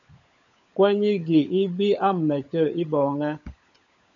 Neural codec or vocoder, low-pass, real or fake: codec, 16 kHz, 16 kbps, FunCodec, trained on LibriTTS, 50 frames a second; 7.2 kHz; fake